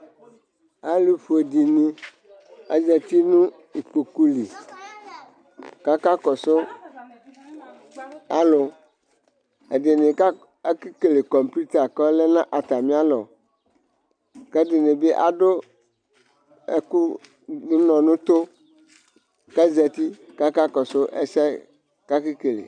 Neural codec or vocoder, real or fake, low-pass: none; real; 9.9 kHz